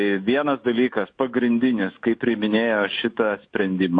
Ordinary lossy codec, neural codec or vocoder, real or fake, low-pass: AAC, 48 kbps; autoencoder, 48 kHz, 128 numbers a frame, DAC-VAE, trained on Japanese speech; fake; 9.9 kHz